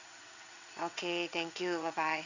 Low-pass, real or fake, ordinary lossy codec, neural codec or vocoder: 7.2 kHz; fake; none; vocoder, 22.05 kHz, 80 mel bands, WaveNeXt